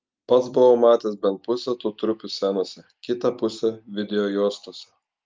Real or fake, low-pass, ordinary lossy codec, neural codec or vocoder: real; 7.2 kHz; Opus, 24 kbps; none